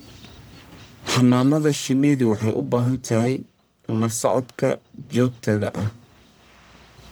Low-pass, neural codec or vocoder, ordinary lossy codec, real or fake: none; codec, 44.1 kHz, 1.7 kbps, Pupu-Codec; none; fake